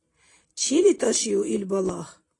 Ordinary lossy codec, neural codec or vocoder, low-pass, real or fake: AAC, 32 kbps; vocoder, 44.1 kHz, 128 mel bands every 256 samples, BigVGAN v2; 10.8 kHz; fake